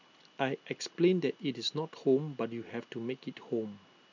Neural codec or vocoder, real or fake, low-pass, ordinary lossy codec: none; real; 7.2 kHz; none